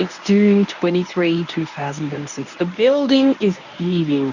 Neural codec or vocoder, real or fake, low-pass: codec, 24 kHz, 0.9 kbps, WavTokenizer, medium speech release version 1; fake; 7.2 kHz